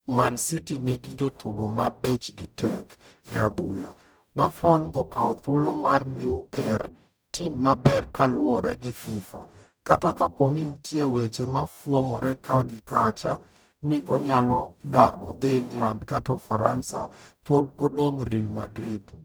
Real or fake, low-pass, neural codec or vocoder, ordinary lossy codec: fake; none; codec, 44.1 kHz, 0.9 kbps, DAC; none